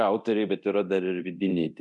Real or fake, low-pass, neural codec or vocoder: fake; 10.8 kHz; codec, 24 kHz, 0.9 kbps, DualCodec